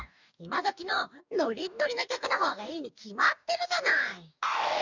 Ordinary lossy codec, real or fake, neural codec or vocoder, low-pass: none; fake; codec, 44.1 kHz, 2.6 kbps, DAC; 7.2 kHz